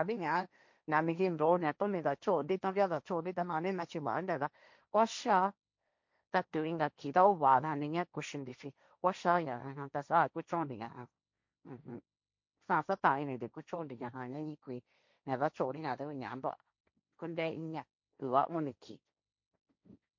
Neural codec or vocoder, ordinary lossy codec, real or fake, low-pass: codec, 16 kHz, 1.1 kbps, Voila-Tokenizer; MP3, 48 kbps; fake; 7.2 kHz